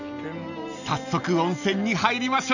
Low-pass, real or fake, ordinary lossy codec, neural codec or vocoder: 7.2 kHz; real; none; none